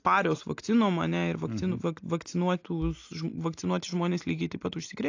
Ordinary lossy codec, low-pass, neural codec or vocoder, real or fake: AAC, 48 kbps; 7.2 kHz; none; real